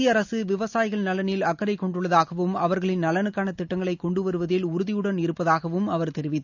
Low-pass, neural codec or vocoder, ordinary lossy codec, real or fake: 7.2 kHz; none; none; real